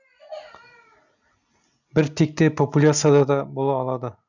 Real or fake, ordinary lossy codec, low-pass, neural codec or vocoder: real; none; 7.2 kHz; none